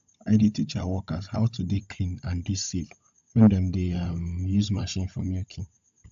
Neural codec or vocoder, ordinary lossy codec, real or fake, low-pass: codec, 16 kHz, 16 kbps, FunCodec, trained on Chinese and English, 50 frames a second; AAC, 64 kbps; fake; 7.2 kHz